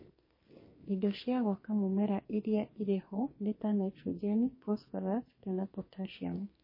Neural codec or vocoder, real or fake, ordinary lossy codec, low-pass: codec, 44.1 kHz, 2.6 kbps, SNAC; fake; MP3, 24 kbps; 5.4 kHz